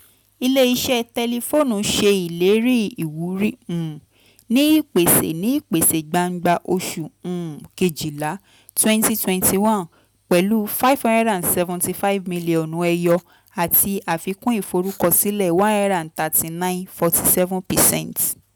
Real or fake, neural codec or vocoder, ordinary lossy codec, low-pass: real; none; none; none